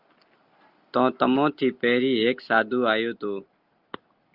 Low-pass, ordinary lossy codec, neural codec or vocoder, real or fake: 5.4 kHz; Opus, 32 kbps; none; real